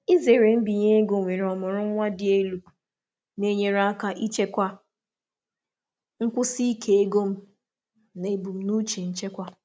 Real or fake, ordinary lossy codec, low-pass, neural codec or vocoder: real; none; none; none